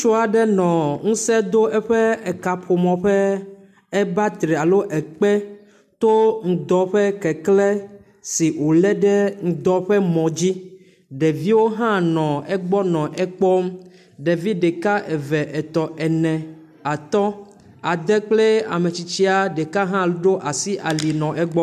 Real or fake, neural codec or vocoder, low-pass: real; none; 14.4 kHz